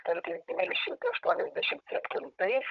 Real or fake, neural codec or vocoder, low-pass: fake; codec, 16 kHz, 16 kbps, FunCodec, trained on Chinese and English, 50 frames a second; 7.2 kHz